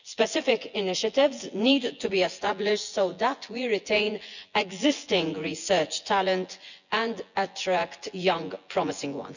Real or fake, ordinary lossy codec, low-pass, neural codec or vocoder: fake; none; 7.2 kHz; vocoder, 24 kHz, 100 mel bands, Vocos